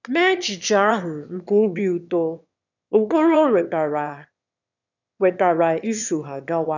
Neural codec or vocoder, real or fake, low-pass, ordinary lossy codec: autoencoder, 22.05 kHz, a latent of 192 numbers a frame, VITS, trained on one speaker; fake; 7.2 kHz; none